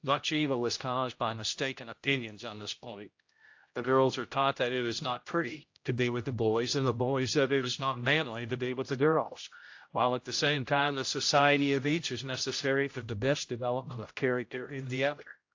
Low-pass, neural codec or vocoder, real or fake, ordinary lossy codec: 7.2 kHz; codec, 16 kHz, 0.5 kbps, X-Codec, HuBERT features, trained on general audio; fake; AAC, 48 kbps